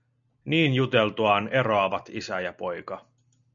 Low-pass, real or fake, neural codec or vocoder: 7.2 kHz; real; none